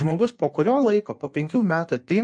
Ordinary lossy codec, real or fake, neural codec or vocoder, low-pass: MP3, 64 kbps; fake; codec, 16 kHz in and 24 kHz out, 1.1 kbps, FireRedTTS-2 codec; 9.9 kHz